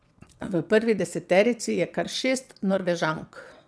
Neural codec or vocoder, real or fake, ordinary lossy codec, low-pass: vocoder, 22.05 kHz, 80 mel bands, WaveNeXt; fake; none; none